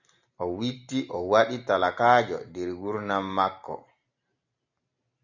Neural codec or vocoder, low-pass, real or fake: none; 7.2 kHz; real